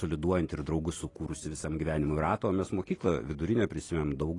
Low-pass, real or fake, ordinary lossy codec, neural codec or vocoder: 10.8 kHz; real; AAC, 32 kbps; none